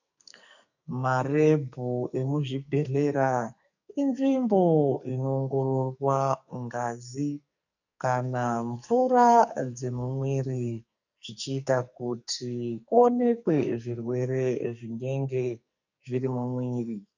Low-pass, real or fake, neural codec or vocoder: 7.2 kHz; fake; codec, 44.1 kHz, 2.6 kbps, SNAC